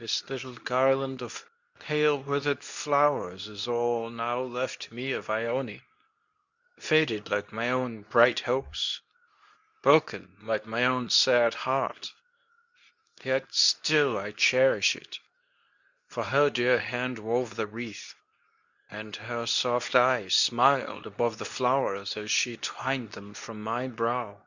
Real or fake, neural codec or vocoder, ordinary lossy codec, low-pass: fake; codec, 24 kHz, 0.9 kbps, WavTokenizer, medium speech release version 2; Opus, 64 kbps; 7.2 kHz